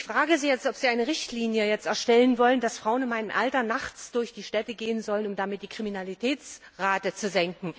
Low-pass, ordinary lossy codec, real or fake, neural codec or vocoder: none; none; real; none